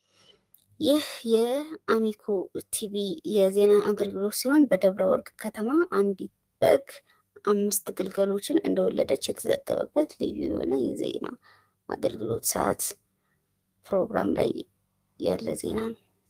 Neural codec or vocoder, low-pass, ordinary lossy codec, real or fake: codec, 32 kHz, 1.9 kbps, SNAC; 14.4 kHz; Opus, 32 kbps; fake